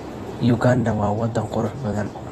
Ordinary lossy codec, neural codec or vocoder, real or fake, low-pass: AAC, 32 kbps; vocoder, 44.1 kHz, 128 mel bands every 256 samples, BigVGAN v2; fake; 19.8 kHz